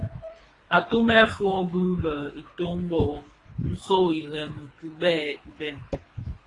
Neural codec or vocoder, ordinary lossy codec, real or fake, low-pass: codec, 24 kHz, 3 kbps, HILCodec; AAC, 32 kbps; fake; 10.8 kHz